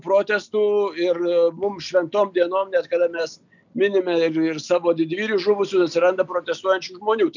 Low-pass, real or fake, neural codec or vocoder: 7.2 kHz; real; none